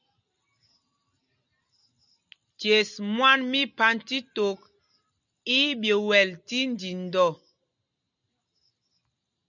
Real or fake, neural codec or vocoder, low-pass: real; none; 7.2 kHz